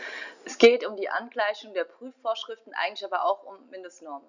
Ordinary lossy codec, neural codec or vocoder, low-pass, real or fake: none; none; 7.2 kHz; real